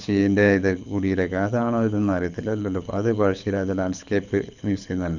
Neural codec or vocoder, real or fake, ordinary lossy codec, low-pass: codec, 24 kHz, 6 kbps, HILCodec; fake; none; 7.2 kHz